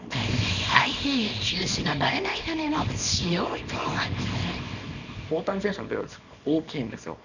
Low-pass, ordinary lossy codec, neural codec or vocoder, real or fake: 7.2 kHz; none; codec, 24 kHz, 0.9 kbps, WavTokenizer, small release; fake